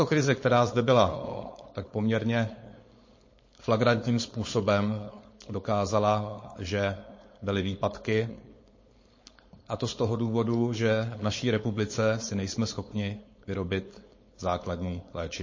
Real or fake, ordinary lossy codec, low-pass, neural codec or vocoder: fake; MP3, 32 kbps; 7.2 kHz; codec, 16 kHz, 4.8 kbps, FACodec